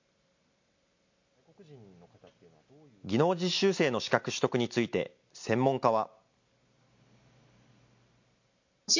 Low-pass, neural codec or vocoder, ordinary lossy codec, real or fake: 7.2 kHz; none; MP3, 48 kbps; real